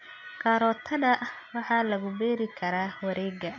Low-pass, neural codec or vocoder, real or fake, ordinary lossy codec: 7.2 kHz; none; real; none